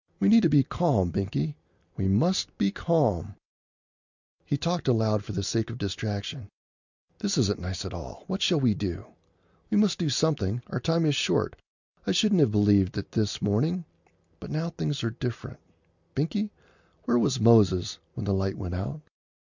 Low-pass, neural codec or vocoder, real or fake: 7.2 kHz; none; real